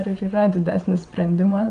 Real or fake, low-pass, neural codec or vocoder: fake; 9.9 kHz; vocoder, 22.05 kHz, 80 mel bands, WaveNeXt